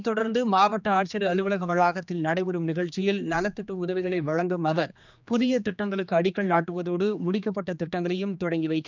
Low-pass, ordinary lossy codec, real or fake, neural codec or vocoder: 7.2 kHz; none; fake; codec, 16 kHz, 2 kbps, X-Codec, HuBERT features, trained on general audio